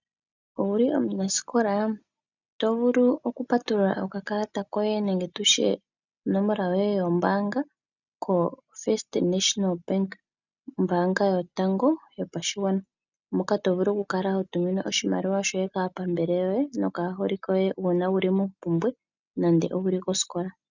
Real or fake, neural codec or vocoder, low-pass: real; none; 7.2 kHz